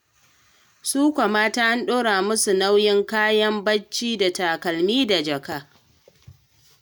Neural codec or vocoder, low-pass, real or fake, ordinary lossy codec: none; none; real; none